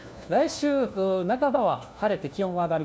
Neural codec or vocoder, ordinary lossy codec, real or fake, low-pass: codec, 16 kHz, 1 kbps, FunCodec, trained on LibriTTS, 50 frames a second; none; fake; none